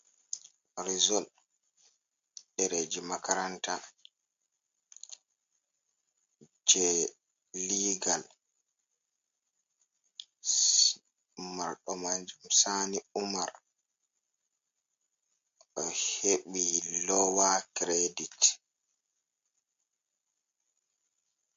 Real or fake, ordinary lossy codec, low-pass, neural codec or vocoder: real; MP3, 48 kbps; 7.2 kHz; none